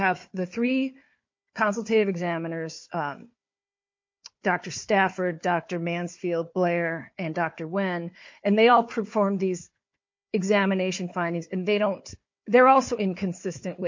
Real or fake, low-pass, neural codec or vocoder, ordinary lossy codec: fake; 7.2 kHz; codec, 16 kHz, 4 kbps, FunCodec, trained on Chinese and English, 50 frames a second; MP3, 48 kbps